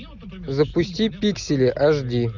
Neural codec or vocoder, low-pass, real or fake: none; 7.2 kHz; real